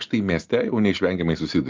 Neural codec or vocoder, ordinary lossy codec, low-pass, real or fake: none; Opus, 24 kbps; 7.2 kHz; real